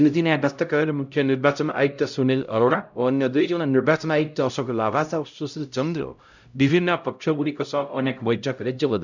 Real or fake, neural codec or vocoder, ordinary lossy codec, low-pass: fake; codec, 16 kHz, 0.5 kbps, X-Codec, HuBERT features, trained on LibriSpeech; none; 7.2 kHz